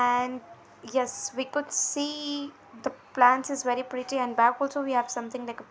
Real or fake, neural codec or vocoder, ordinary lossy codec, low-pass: real; none; none; none